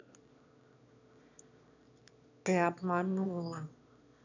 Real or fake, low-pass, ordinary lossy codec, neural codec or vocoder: fake; 7.2 kHz; none; autoencoder, 22.05 kHz, a latent of 192 numbers a frame, VITS, trained on one speaker